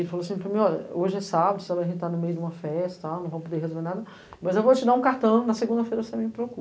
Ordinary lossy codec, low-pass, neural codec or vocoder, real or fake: none; none; none; real